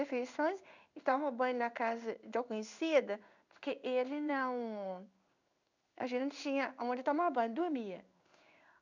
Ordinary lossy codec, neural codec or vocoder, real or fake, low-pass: none; codec, 16 kHz in and 24 kHz out, 1 kbps, XY-Tokenizer; fake; 7.2 kHz